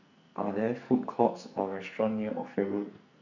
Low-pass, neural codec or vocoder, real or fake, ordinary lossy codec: 7.2 kHz; codec, 44.1 kHz, 2.6 kbps, SNAC; fake; none